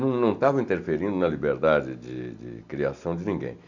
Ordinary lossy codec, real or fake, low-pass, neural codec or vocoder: none; real; 7.2 kHz; none